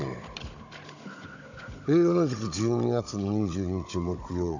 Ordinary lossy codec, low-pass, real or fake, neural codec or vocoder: none; 7.2 kHz; fake; codec, 16 kHz, 16 kbps, FunCodec, trained on Chinese and English, 50 frames a second